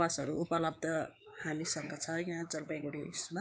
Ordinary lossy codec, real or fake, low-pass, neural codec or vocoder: none; fake; none; codec, 16 kHz, 4 kbps, X-Codec, WavLM features, trained on Multilingual LibriSpeech